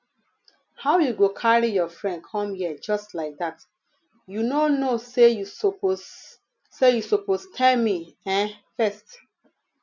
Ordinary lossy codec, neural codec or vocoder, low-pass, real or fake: none; none; 7.2 kHz; real